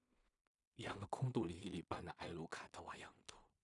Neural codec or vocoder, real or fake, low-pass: codec, 16 kHz in and 24 kHz out, 0.4 kbps, LongCat-Audio-Codec, two codebook decoder; fake; 10.8 kHz